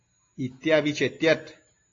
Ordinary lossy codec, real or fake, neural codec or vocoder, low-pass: AAC, 32 kbps; real; none; 7.2 kHz